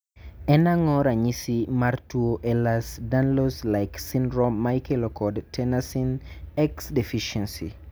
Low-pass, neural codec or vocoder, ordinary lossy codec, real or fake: none; none; none; real